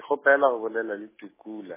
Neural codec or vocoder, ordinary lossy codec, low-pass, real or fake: none; MP3, 16 kbps; 3.6 kHz; real